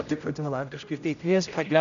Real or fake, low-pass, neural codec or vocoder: fake; 7.2 kHz; codec, 16 kHz, 0.5 kbps, X-Codec, HuBERT features, trained on general audio